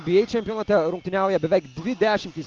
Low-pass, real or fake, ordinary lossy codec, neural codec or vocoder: 7.2 kHz; real; Opus, 32 kbps; none